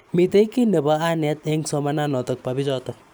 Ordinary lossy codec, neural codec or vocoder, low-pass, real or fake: none; none; none; real